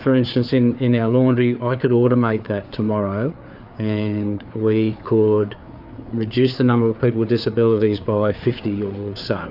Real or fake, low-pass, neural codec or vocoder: fake; 5.4 kHz; codec, 16 kHz, 4 kbps, FreqCodec, larger model